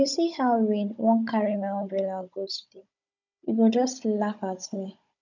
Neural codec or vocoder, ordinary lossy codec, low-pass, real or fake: codec, 16 kHz, 16 kbps, FunCodec, trained on Chinese and English, 50 frames a second; none; 7.2 kHz; fake